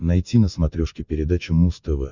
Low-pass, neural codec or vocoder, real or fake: 7.2 kHz; none; real